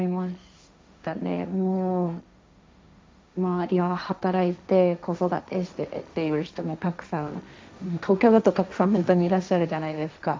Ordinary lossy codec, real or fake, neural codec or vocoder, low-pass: none; fake; codec, 16 kHz, 1.1 kbps, Voila-Tokenizer; none